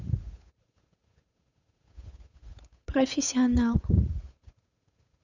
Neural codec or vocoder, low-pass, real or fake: codec, 16 kHz, 8 kbps, FunCodec, trained on Chinese and English, 25 frames a second; 7.2 kHz; fake